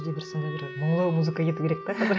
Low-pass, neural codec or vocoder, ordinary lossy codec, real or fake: none; none; none; real